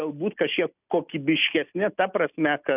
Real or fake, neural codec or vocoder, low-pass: real; none; 3.6 kHz